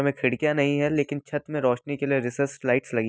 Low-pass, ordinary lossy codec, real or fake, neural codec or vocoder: none; none; real; none